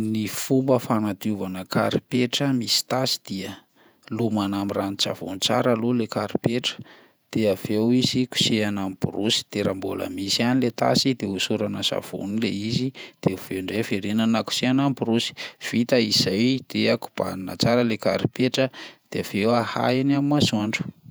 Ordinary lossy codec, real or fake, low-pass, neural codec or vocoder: none; fake; none; vocoder, 48 kHz, 128 mel bands, Vocos